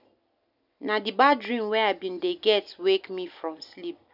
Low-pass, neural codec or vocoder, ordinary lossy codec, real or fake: 5.4 kHz; none; none; real